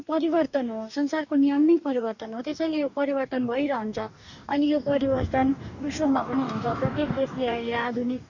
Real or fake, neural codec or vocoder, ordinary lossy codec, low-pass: fake; codec, 44.1 kHz, 2.6 kbps, DAC; none; 7.2 kHz